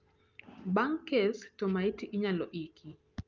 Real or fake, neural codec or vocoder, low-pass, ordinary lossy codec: real; none; 7.2 kHz; Opus, 24 kbps